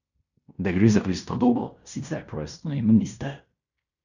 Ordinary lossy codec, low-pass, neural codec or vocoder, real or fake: none; 7.2 kHz; codec, 16 kHz in and 24 kHz out, 0.9 kbps, LongCat-Audio-Codec, fine tuned four codebook decoder; fake